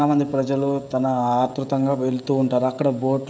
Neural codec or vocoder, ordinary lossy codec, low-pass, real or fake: codec, 16 kHz, 16 kbps, FreqCodec, smaller model; none; none; fake